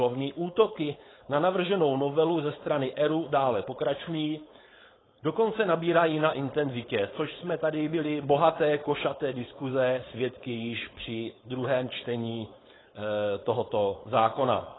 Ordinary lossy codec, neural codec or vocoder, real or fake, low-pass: AAC, 16 kbps; codec, 16 kHz, 4.8 kbps, FACodec; fake; 7.2 kHz